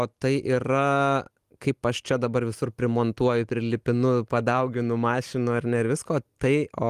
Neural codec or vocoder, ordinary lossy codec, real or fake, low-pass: none; Opus, 24 kbps; real; 14.4 kHz